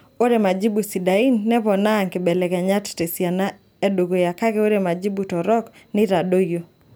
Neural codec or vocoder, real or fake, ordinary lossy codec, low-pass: none; real; none; none